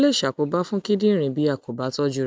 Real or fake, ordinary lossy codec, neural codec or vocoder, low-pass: real; none; none; none